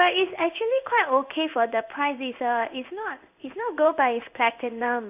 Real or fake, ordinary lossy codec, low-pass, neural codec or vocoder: fake; none; 3.6 kHz; codec, 16 kHz in and 24 kHz out, 1 kbps, XY-Tokenizer